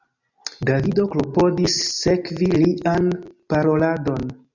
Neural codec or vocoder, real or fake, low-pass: none; real; 7.2 kHz